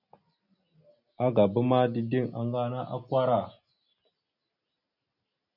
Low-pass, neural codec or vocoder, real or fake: 5.4 kHz; none; real